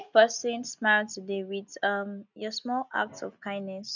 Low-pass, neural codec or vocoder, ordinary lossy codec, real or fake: 7.2 kHz; none; none; real